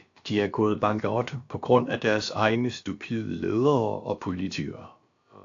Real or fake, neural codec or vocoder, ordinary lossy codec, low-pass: fake; codec, 16 kHz, about 1 kbps, DyCAST, with the encoder's durations; AAC, 48 kbps; 7.2 kHz